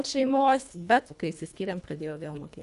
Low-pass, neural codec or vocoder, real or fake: 10.8 kHz; codec, 24 kHz, 1.5 kbps, HILCodec; fake